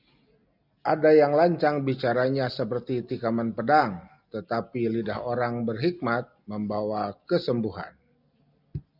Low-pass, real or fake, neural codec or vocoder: 5.4 kHz; real; none